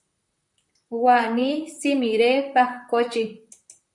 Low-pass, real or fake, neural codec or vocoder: 10.8 kHz; fake; vocoder, 44.1 kHz, 128 mel bands, Pupu-Vocoder